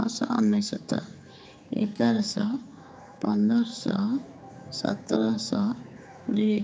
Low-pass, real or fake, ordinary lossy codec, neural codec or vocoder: none; fake; none; codec, 16 kHz, 4 kbps, X-Codec, HuBERT features, trained on general audio